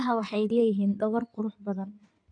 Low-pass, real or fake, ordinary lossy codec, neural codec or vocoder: 9.9 kHz; fake; AAC, 48 kbps; codec, 16 kHz in and 24 kHz out, 2.2 kbps, FireRedTTS-2 codec